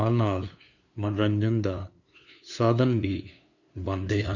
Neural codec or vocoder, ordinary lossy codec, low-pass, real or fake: codec, 16 kHz, 2 kbps, FunCodec, trained on LibriTTS, 25 frames a second; none; 7.2 kHz; fake